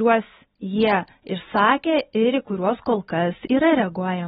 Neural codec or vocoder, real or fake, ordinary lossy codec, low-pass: none; real; AAC, 16 kbps; 19.8 kHz